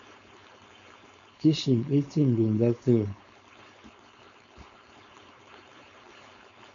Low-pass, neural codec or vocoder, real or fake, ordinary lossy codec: 7.2 kHz; codec, 16 kHz, 4.8 kbps, FACodec; fake; MP3, 64 kbps